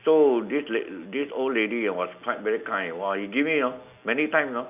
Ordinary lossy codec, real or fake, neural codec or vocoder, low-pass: none; real; none; 3.6 kHz